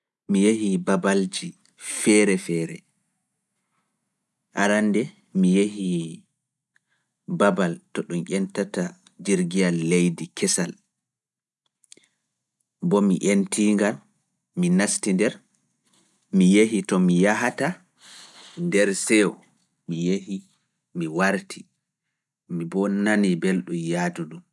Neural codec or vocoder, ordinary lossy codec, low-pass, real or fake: none; none; none; real